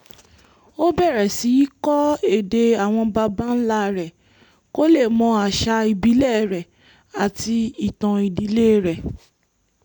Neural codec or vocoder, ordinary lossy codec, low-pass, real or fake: none; none; 19.8 kHz; real